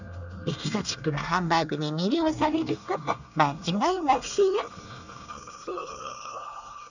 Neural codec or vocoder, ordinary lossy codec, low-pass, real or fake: codec, 24 kHz, 1 kbps, SNAC; none; 7.2 kHz; fake